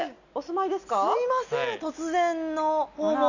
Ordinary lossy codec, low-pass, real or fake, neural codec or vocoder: none; 7.2 kHz; real; none